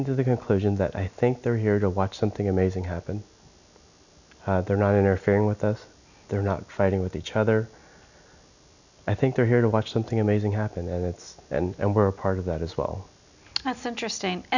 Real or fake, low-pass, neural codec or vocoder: real; 7.2 kHz; none